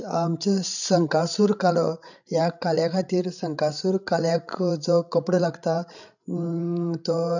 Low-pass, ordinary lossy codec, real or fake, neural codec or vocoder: 7.2 kHz; none; fake; codec, 16 kHz, 16 kbps, FreqCodec, larger model